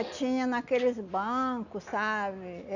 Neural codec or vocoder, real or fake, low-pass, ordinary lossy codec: none; real; 7.2 kHz; none